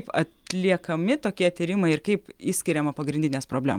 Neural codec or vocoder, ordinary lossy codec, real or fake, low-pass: none; Opus, 32 kbps; real; 19.8 kHz